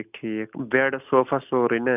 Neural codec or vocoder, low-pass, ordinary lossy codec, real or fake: none; 3.6 kHz; none; real